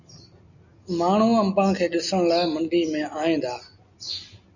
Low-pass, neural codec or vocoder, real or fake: 7.2 kHz; none; real